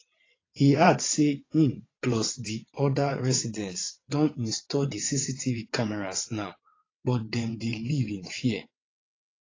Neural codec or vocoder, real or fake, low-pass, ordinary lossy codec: vocoder, 22.05 kHz, 80 mel bands, WaveNeXt; fake; 7.2 kHz; AAC, 32 kbps